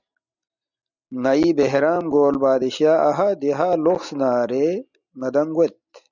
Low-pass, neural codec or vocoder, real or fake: 7.2 kHz; none; real